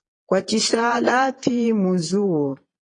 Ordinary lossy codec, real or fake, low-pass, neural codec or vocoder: AAC, 32 kbps; fake; 9.9 kHz; vocoder, 22.05 kHz, 80 mel bands, Vocos